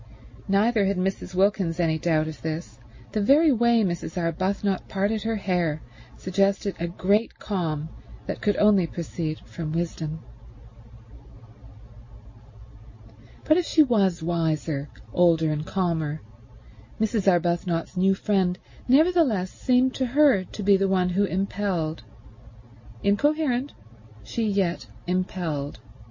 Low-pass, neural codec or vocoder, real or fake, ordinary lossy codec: 7.2 kHz; none; real; MP3, 32 kbps